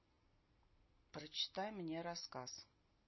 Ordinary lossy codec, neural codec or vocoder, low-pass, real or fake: MP3, 24 kbps; none; 7.2 kHz; real